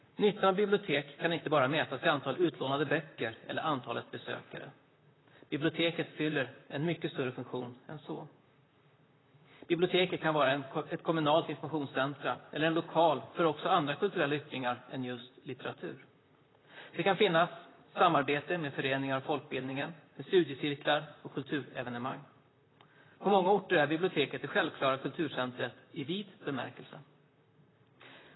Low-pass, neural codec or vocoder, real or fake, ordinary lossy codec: 7.2 kHz; vocoder, 44.1 kHz, 128 mel bands, Pupu-Vocoder; fake; AAC, 16 kbps